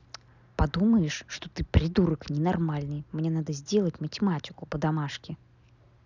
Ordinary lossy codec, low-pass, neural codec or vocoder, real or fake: none; 7.2 kHz; none; real